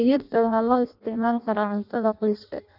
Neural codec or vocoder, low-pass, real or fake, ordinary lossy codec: codec, 16 kHz in and 24 kHz out, 0.6 kbps, FireRedTTS-2 codec; 5.4 kHz; fake; none